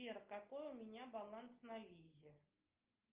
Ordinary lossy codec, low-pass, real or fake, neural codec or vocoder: Opus, 24 kbps; 3.6 kHz; real; none